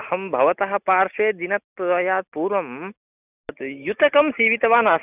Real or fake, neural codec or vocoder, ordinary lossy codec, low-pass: real; none; none; 3.6 kHz